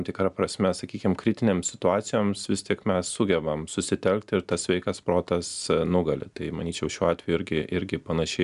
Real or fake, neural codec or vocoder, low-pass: real; none; 10.8 kHz